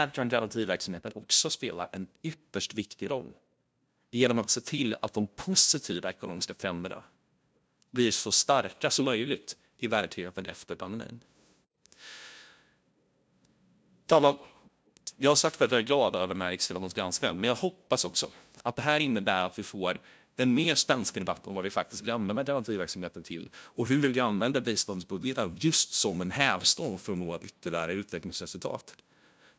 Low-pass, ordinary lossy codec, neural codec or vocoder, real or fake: none; none; codec, 16 kHz, 0.5 kbps, FunCodec, trained on LibriTTS, 25 frames a second; fake